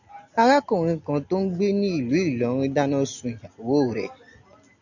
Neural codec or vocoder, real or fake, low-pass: none; real; 7.2 kHz